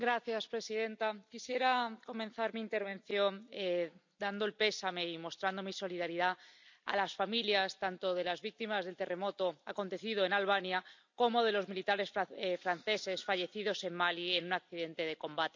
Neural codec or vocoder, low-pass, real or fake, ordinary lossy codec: none; 7.2 kHz; real; MP3, 64 kbps